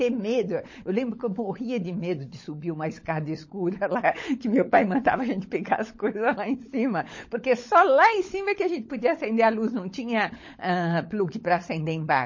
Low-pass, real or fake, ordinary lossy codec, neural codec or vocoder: 7.2 kHz; fake; MP3, 32 kbps; codec, 16 kHz, 16 kbps, FunCodec, trained on LibriTTS, 50 frames a second